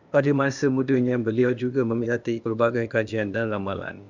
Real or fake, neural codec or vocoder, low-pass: fake; codec, 16 kHz, 0.8 kbps, ZipCodec; 7.2 kHz